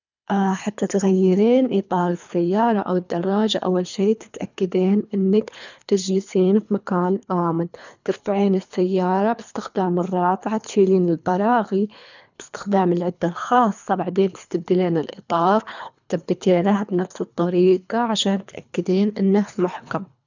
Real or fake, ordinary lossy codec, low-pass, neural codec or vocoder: fake; none; 7.2 kHz; codec, 24 kHz, 3 kbps, HILCodec